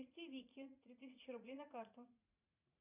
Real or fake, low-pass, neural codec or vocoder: fake; 3.6 kHz; vocoder, 44.1 kHz, 80 mel bands, Vocos